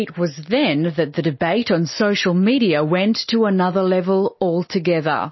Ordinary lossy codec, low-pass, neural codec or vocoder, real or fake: MP3, 24 kbps; 7.2 kHz; none; real